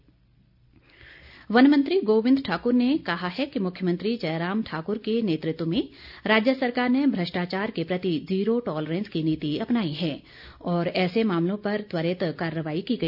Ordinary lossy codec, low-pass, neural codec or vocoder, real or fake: none; 5.4 kHz; none; real